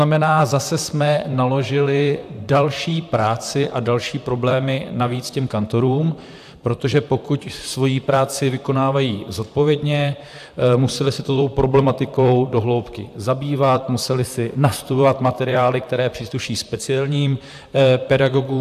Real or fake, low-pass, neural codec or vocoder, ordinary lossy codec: fake; 14.4 kHz; vocoder, 44.1 kHz, 128 mel bands, Pupu-Vocoder; AAC, 96 kbps